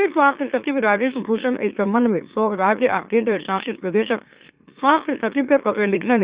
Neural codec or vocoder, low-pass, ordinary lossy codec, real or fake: autoencoder, 44.1 kHz, a latent of 192 numbers a frame, MeloTTS; 3.6 kHz; Opus, 64 kbps; fake